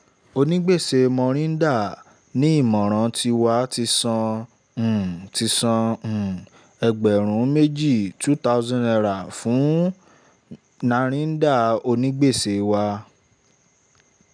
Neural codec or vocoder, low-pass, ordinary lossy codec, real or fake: none; 9.9 kHz; none; real